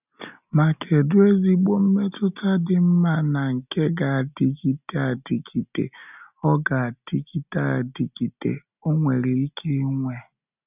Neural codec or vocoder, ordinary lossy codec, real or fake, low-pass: none; none; real; 3.6 kHz